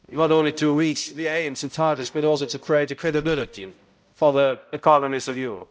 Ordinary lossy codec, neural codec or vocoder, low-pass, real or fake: none; codec, 16 kHz, 0.5 kbps, X-Codec, HuBERT features, trained on balanced general audio; none; fake